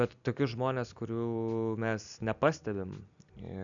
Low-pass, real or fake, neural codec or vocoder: 7.2 kHz; real; none